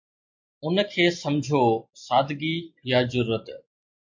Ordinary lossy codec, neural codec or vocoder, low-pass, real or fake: MP3, 48 kbps; none; 7.2 kHz; real